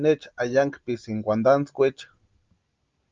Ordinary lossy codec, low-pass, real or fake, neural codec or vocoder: Opus, 32 kbps; 7.2 kHz; real; none